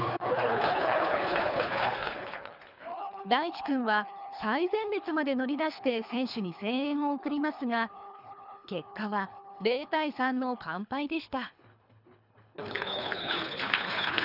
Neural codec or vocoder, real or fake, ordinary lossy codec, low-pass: codec, 24 kHz, 3 kbps, HILCodec; fake; none; 5.4 kHz